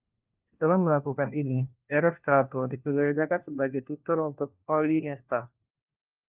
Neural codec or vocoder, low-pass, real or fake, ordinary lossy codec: codec, 16 kHz, 1 kbps, FunCodec, trained on LibriTTS, 50 frames a second; 3.6 kHz; fake; Opus, 24 kbps